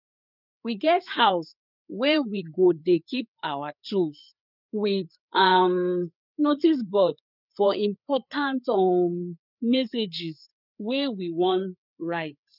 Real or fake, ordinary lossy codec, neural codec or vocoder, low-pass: fake; none; codec, 16 kHz, 4 kbps, FreqCodec, larger model; 5.4 kHz